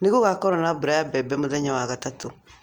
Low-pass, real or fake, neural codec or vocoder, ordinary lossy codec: 19.8 kHz; real; none; Opus, 32 kbps